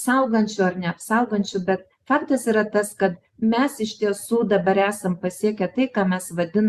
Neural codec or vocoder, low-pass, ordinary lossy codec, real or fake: none; 14.4 kHz; Opus, 64 kbps; real